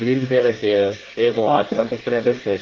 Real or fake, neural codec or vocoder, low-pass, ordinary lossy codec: fake; codec, 24 kHz, 1 kbps, SNAC; 7.2 kHz; Opus, 16 kbps